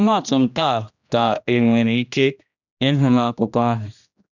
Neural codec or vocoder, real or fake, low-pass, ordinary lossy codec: codec, 16 kHz, 1 kbps, X-Codec, HuBERT features, trained on general audio; fake; 7.2 kHz; none